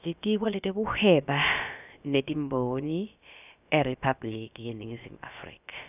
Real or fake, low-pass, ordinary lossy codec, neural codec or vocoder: fake; 3.6 kHz; none; codec, 16 kHz, about 1 kbps, DyCAST, with the encoder's durations